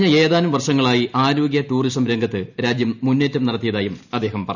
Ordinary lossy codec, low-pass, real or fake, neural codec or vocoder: none; 7.2 kHz; real; none